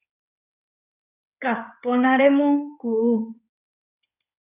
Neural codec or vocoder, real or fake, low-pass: codec, 16 kHz in and 24 kHz out, 2.2 kbps, FireRedTTS-2 codec; fake; 3.6 kHz